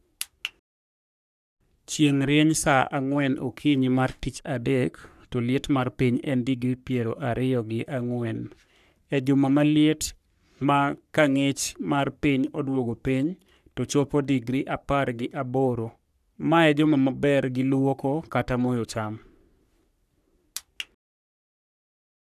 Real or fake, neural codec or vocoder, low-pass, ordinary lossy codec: fake; codec, 44.1 kHz, 3.4 kbps, Pupu-Codec; 14.4 kHz; none